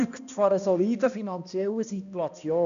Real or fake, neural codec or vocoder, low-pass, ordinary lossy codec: fake; codec, 16 kHz, 1 kbps, X-Codec, HuBERT features, trained on balanced general audio; 7.2 kHz; none